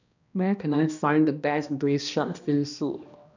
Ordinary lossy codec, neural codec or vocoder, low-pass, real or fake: none; codec, 16 kHz, 1 kbps, X-Codec, HuBERT features, trained on balanced general audio; 7.2 kHz; fake